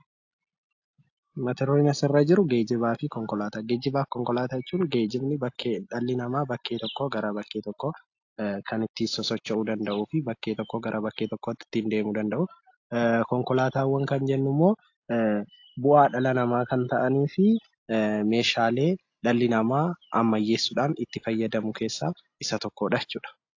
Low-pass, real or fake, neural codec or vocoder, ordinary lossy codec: 7.2 kHz; real; none; AAC, 48 kbps